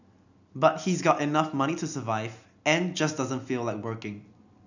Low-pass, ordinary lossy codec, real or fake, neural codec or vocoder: 7.2 kHz; none; real; none